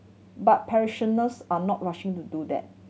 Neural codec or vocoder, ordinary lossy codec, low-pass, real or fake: none; none; none; real